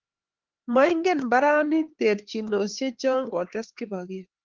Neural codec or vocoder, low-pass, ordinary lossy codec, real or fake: codec, 16 kHz, 4 kbps, X-Codec, HuBERT features, trained on LibriSpeech; 7.2 kHz; Opus, 16 kbps; fake